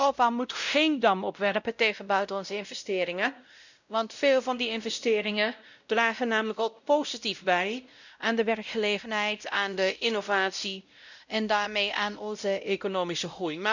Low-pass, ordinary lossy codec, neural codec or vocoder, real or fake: 7.2 kHz; none; codec, 16 kHz, 0.5 kbps, X-Codec, WavLM features, trained on Multilingual LibriSpeech; fake